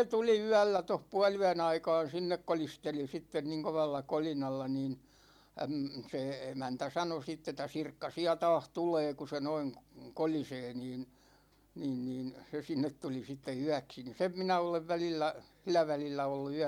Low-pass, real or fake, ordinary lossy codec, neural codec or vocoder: 19.8 kHz; real; MP3, 96 kbps; none